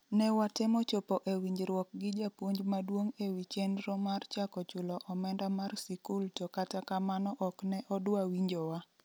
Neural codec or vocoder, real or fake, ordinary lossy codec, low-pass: none; real; none; none